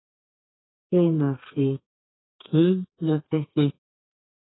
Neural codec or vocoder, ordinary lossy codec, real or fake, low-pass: codec, 32 kHz, 1.9 kbps, SNAC; AAC, 16 kbps; fake; 7.2 kHz